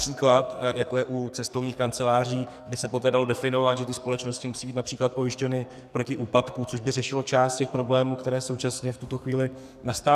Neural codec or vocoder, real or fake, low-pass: codec, 44.1 kHz, 2.6 kbps, SNAC; fake; 14.4 kHz